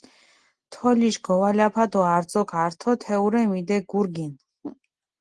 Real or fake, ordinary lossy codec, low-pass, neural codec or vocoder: real; Opus, 16 kbps; 9.9 kHz; none